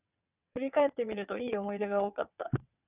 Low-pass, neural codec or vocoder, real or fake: 3.6 kHz; vocoder, 22.05 kHz, 80 mel bands, WaveNeXt; fake